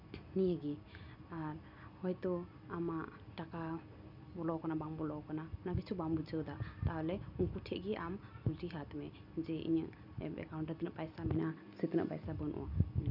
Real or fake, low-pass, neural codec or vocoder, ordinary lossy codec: real; 5.4 kHz; none; none